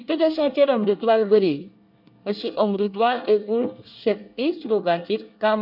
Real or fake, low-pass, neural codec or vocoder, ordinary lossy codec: fake; 5.4 kHz; codec, 24 kHz, 1 kbps, SNAC; MP3, 48 kbps